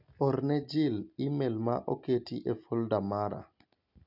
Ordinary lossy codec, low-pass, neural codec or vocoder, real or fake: none; 5.4 kHz; none; real